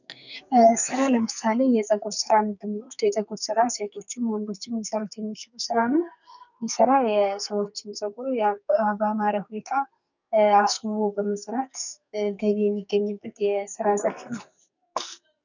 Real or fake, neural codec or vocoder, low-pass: fake; codec, 44.1 kHz, 2.6 kbps, SNAC; 7.2 kHz